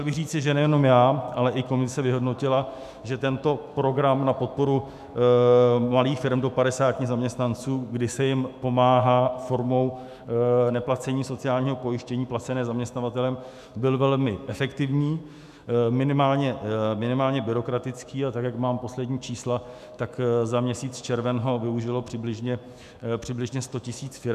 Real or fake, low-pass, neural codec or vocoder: fake; 14.4 kHz; autoencoder, 48 kHz, 128 numbers a frame, DAC-VAE, trained on Japanese speech